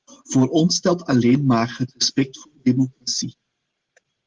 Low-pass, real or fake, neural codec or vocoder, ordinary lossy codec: 7.2 kHz; real; none; Opus, 16 kbps